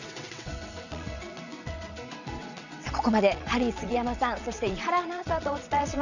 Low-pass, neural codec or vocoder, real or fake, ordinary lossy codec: 7.2 kHz; vocoder, 22.05 kHz, 80 mel bands, WaveNeXt; fake; none